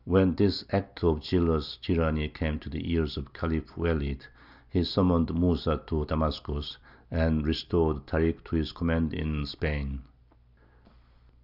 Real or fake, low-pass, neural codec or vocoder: real; 5.4 kHz; none